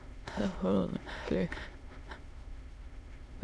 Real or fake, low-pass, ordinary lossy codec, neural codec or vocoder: fake; none; none; autoencoder, 22.05 kHz, a latent of 192 numbers a frame, VITS, trained on many speakers